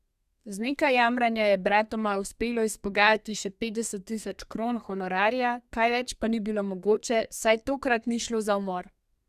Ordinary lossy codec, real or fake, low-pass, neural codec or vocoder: Opus, 64 kbps; fake; 14.4 kHz; codec, 32 kHz, 1.9 kbps, SNAC